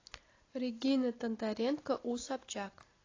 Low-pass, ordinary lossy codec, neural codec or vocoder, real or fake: 7.2 kHz; AAC, 32 kbps; none; real